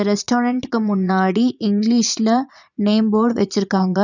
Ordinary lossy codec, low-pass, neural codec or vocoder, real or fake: none; 7.2 kHz; vocoder, 22.05 kHz, 80 mel bands, Vocos; fake